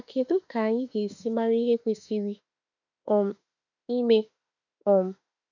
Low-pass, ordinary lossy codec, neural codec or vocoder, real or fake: 7.2 kHz; none; autoencoder, 48 kHz, 32 numbers a frame, DAC-VAE, trained on Japanese speech; fake